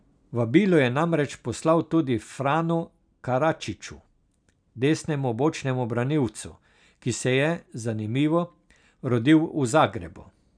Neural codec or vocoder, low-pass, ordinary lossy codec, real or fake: none; 9.9 kHz; none; real